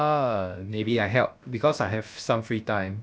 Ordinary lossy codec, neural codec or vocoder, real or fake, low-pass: none; codec, 16 kHz, about 1 kbps, DyCAST, with the encoder's durations; fake; none